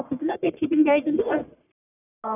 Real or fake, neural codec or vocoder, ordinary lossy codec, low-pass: fake; codec, 44.1 kHz, 1.7 kbps, Pupu-Codec; none; 3.6 kHz